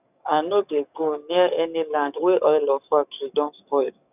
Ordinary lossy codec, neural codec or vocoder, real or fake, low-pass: none; codec, 44.1 kHz, 7.8 kbps, DAC; fake; 3.6 kHz